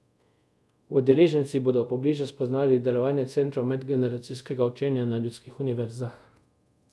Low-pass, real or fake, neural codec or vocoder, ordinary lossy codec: none; fake; codec, 24 kHz, 0.5 kbps, DualCodec; none